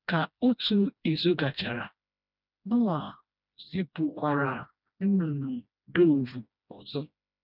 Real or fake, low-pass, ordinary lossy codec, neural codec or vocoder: fake; 5.4 kHz; none; codec, 16 kHz, 1 kbps, FreqCodec, smaller model